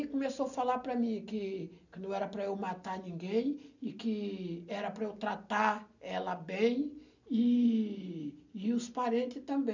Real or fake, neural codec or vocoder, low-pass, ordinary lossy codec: real; none; 7.2 kHz; none